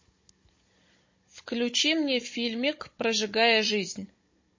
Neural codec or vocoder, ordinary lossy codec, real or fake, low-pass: codec, 16 kHz, 16 kbps, FunCodec, trained on Chinese and English, 50 frames a second; MP3, 32 kbps; fake; 7.2 kHz